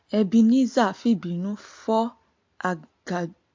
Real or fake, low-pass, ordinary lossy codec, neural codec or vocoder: real; 7.2 kHz; MP3, 48 kbps; none